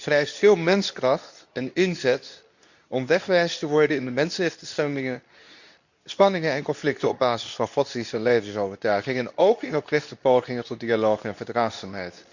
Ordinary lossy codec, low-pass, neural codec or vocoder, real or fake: none; 7.2 kHz; codec, 24 kHz, 0.9 kbps, WavTokenizer, medium speech release version 2; fake